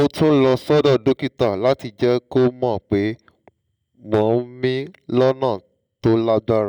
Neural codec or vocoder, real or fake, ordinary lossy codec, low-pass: none; real; none; 19.8 kHz